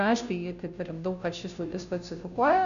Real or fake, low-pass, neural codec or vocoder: fake; 7.2 kHz; codec, 16 kHz, 0.5 kbps, FunCodec, trained on Chinese and English, 25 frames a second